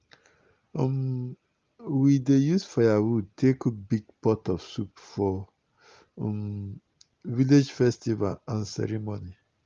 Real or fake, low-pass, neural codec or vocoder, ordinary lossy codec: real; 7.2 kHz; none; Opus, 32 kbps